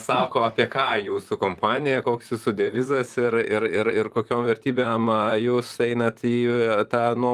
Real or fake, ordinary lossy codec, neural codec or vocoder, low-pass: fake; Opus, 32 kbps; vocoder, 44.1 kHz, 128 mel bands, Pupu-Vocoder; 14.4 kHz